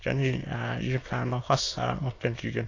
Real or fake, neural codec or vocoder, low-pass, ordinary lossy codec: fake; autoencoder, 22.05 kHz, a latent of 192 numbers a frame, VITS, trained on many speakers; 7.2 kHz; AAC, 32 kbps